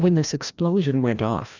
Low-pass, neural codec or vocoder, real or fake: 7.2 kHz; codec, 16 kHz, 1 kbps, FreqCodec, larger model; fake